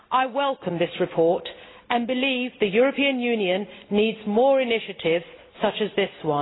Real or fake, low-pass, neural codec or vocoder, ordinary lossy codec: real; 7.2 kHz; none; AAC, 16 kbps